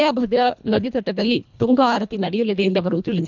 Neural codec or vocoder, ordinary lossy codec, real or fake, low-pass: codec, 24 kHz, 1.5 kbps, HILCodec; none; fake; 7.2 kHz